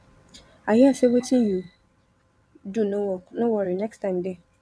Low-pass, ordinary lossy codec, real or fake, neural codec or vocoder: none; none; fake; vocoder, 22.05 kHz, 80 mel bands, WaveNeXt